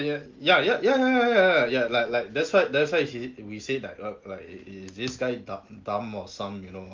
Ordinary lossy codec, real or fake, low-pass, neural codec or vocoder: Opus, 32 kbps; real; 7.2 kHz; none